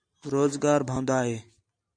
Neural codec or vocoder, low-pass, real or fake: vocoder, 44.1 kHz, 128 mel bands every 512 samples, BigVGAN v2; 9.9 kHz; fake